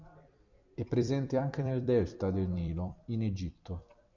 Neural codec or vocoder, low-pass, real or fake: vocoder, 44.1 kHz, 128 mel bands every 256 samples, BigVGAN v2; 7.2 kHz; fake